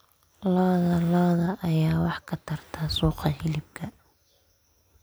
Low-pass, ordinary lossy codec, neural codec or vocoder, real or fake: none; none; none; real